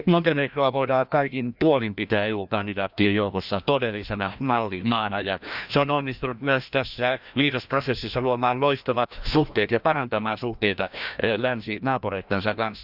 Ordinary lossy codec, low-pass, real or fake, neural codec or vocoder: none; 5.4 kHz; fake; codec, 16 kHz, 1 kbps, FreqCodec, larger model